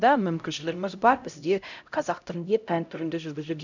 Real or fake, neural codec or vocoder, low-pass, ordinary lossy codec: fake; codec, 16 kHz, 0.5 kbps, X-Codec, HuBERT features, trained on LibriSpeech; 7.2 kHz; none